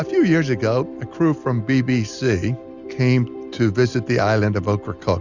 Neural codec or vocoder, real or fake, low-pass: none; real; 7.2 kHz